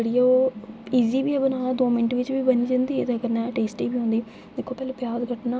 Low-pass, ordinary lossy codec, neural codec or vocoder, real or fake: none; none; none; real